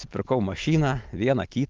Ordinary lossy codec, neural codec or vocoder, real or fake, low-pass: Opus, 32 kbps; none; real; 7.2 kHz